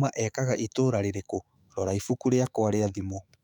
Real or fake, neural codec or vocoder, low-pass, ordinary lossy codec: fake; autoencoder, 48 kHz, 128 numbers a frame, DAC-VAE, trained on Japanese speech; 19.8 kHz; none